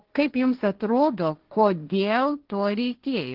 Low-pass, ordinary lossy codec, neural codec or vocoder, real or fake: 5.4 kHz; Opus, 16 kbps; codec, 16 kHz, 1.1 kbps, Voila-Tokenizer; fake